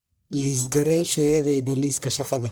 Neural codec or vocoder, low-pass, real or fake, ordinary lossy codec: codec, 44.1 kHz, 1.7 kbps, Pupu-Codec; none; fake; none